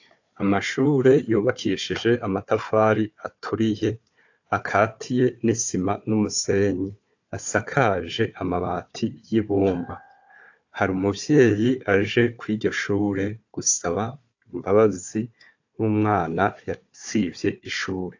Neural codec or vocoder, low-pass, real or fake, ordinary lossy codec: codec, 16 kHz, 4 kbps, FunCodec, trained on Chinese and English, 50 frames a second; 7.2 kHz; fake; AAC, 48 kbps